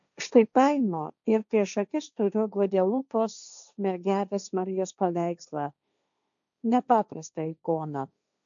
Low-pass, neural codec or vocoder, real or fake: 7.2 kHz; codec, 16 kHz, 1.1 kbps, Voila-Tokenizer; fake